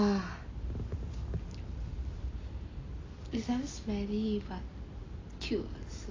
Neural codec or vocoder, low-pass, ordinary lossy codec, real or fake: none; 7.2 kHz; AAC, 32 kbps; real